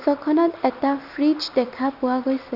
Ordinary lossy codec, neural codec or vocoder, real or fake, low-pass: none; none; real; 5.4 kHz